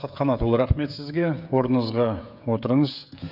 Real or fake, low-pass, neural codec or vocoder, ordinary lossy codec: fake; 5.4 kHz; codec, 16 kHz, 16 kbps, FreqCodec, smaller model; none